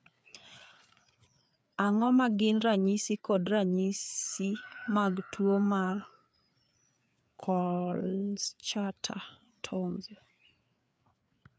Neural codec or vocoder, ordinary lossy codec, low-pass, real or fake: codec, 16 kHz, 4 kbps, FreqCodec, larger model; none; none; fake